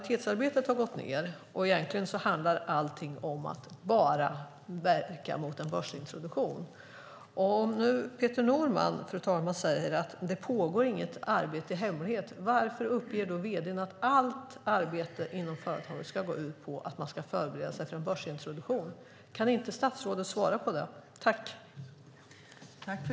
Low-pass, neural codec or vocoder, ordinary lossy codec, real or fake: none; none; none; real